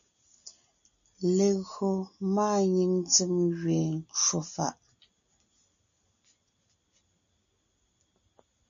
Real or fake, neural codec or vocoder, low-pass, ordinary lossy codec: real; none; 7.2 kHz; MP3, 64 kbps